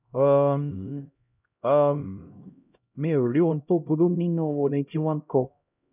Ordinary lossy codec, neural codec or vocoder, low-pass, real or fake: none; codec, 16 kHz, 0.5 kbps, X-Codec, HuBERT features, trained on LibriSpeech; 3.6 kHz; fake